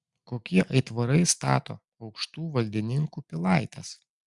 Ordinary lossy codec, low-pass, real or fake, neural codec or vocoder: Opus, 64 kbps; 10.8 kHz; real; none